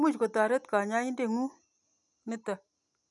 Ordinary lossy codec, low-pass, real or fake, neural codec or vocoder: none; 10.8 kHz; real; none